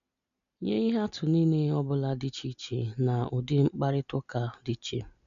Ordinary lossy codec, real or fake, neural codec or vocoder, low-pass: none; real; none; 7.2 kHz